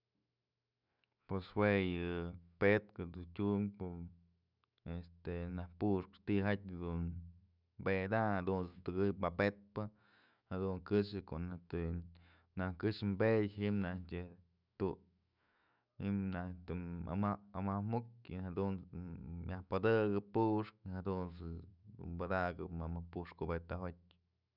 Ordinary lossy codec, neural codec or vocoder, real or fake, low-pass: none; autoencoder, 48 kHz, 128 numbers a frame, DAC-VAE, trained on Japanese speech; fake; 5.4 kHz